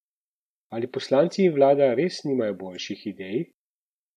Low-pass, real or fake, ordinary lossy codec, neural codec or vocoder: 14.4 kHz; real; none; none